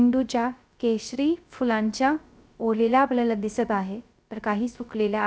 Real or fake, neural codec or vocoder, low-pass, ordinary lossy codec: fake; codec, 16 kHz, 0.3 kbps, FocalCodec; none; none